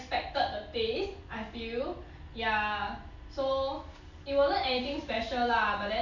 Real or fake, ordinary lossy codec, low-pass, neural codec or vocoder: real; none; 7.2 kHz; none